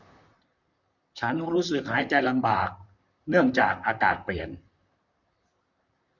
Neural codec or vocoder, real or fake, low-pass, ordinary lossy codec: vocoder, 44.1 kHz, 128 mel bands, Pupu-Vocoder; fake; 7.2 kHz; none